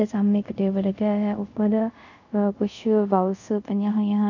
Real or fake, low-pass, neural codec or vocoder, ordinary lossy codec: fake; 7.2 kHz; codec, 24 kHz, 0.5 kbps, DualCodec; none